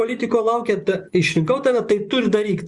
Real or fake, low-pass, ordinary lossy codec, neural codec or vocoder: fake; 10.8 kHz; Opus, 64 kbps; vocoder, 24 kHz, 100 mel bands, Vocos